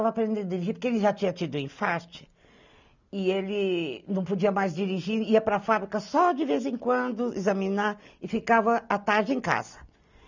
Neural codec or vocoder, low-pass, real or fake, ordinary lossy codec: none; 7.2 kHz; real; none